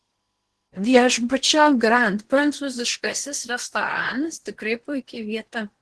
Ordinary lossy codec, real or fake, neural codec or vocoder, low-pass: Opus, 16 kbps; fake; codec, 16 kHz in and 24 kHz out, 0.8 kbps, FocalCodec, streaming, 65536 codes; 10.8 kHz